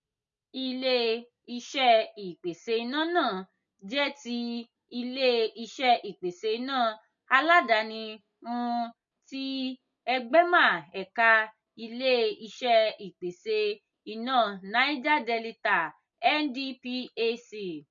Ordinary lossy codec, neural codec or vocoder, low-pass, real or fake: MP3, 48 kbps; none; 7.2 kHz; real